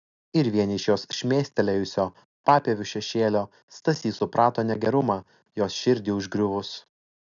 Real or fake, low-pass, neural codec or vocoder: real; 7.2 kHz; none